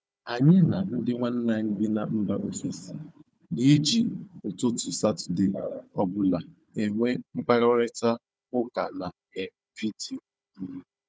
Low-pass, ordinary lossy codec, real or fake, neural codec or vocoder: none; none; fake; codec, 16 kHz, 4 kbps, FunCodec, trained on Chinese and English, 50 frames a second